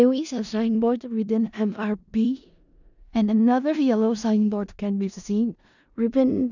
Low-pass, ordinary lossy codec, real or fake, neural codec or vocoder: 7.2 kHz; none; fake; codec, 16 kHz in and 24 kHz out, 0.4 kbps, LongCat-Audio-Codec, four codebook decoder